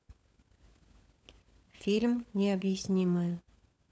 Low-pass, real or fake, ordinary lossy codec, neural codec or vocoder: none; fake; none; codec, 16 kHz, 16 kbps, FunCodec, trained on LibriTTS, 50 frames a second